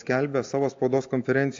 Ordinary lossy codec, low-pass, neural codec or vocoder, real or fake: AAC, 48 kbps; 7.2 kHz; none; real